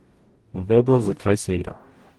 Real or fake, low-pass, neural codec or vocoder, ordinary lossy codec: fake; 19.8 kHz; codec, 44.1 kHz, 0.9 kbps, DAC; Opus, 24 kbps